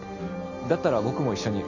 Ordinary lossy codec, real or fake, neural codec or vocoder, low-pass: none; real; none; 7.2 kHz